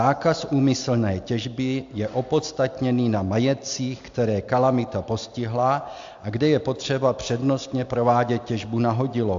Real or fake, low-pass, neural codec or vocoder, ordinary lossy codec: real; 7.2 kHz; none; AAC, 64 kbps